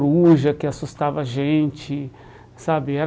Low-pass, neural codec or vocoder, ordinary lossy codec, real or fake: none; none; none; real